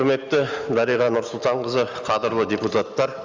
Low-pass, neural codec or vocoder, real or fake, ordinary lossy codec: 7.2 kHz; none; real; Opus, 32 kbps